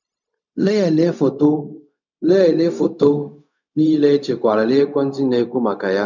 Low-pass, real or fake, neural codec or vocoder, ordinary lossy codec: 7.2 kHz; fake; codec, 16 kHz, 0.4 kbps, LongCat-Audio-Codec; none